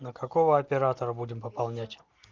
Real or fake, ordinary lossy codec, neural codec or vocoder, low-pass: real; Opus, 24 kbps; none; 7.2 kHz